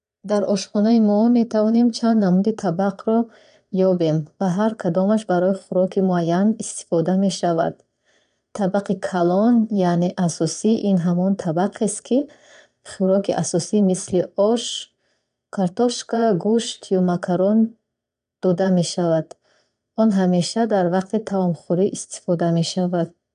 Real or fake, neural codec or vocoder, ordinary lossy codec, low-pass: fake; vocoder, 22.05 kHz, 80 mel bands, Vocos; none; 9.9 kHz